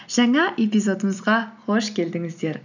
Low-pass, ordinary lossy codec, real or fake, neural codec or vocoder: 7.2 kHz; none; real; none